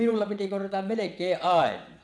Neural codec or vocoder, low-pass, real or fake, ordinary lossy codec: vocoder, 22.05 kHz, 80 mel bands, WaveNeXt; none; fake; none